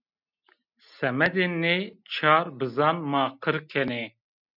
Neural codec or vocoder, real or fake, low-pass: none; real; 5.4 kHz